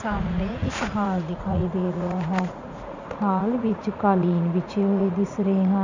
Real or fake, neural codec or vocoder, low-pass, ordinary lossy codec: fake; vocoder, 44.1 kHz, 80 mel bands, Vocos; 7.2 kHz; none